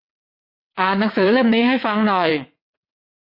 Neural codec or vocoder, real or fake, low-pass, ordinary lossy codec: vocoder, 22.05 kHz, 80 mel bands, WaveNeXt; fake; 5.4 kHz; MP3, 32 kbps